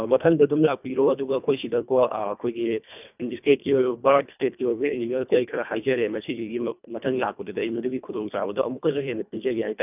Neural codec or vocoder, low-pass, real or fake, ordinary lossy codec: codec, 24 kHz, 1.5 kbps, HILCodec; 3.6 kHz; fake; none